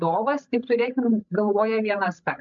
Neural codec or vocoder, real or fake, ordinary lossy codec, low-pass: codec, 16 kHz, 16 kbps, FreqCodec, larger model; fake; MP3, 64 kbps; 7.2 kHz